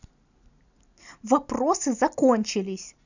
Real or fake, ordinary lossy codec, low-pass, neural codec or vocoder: real; none; 7.2 kHz; none